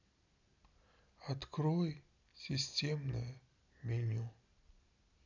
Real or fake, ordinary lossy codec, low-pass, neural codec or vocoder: real; none; 7.2 kHz; none